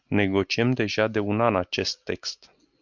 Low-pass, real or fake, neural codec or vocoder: 7.2 kHz; real; none